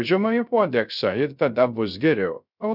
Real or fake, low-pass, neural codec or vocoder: fake; 5.4 kHz; codec, 16 kHz, 0.3 kbps, FocalCodec